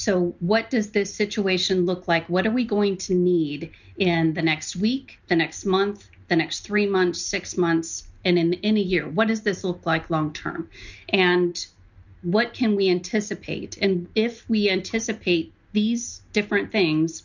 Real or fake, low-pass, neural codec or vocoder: real; 7.2 kHz; none